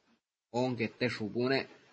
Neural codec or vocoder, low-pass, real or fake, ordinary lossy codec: none; 9.9 kHz; real; MP3, 32 kbps